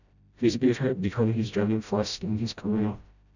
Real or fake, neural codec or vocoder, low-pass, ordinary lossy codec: fake; codec, 16 kHz, 0.5 kbps, FreqCodec, smaller model; 7.2 kHz; none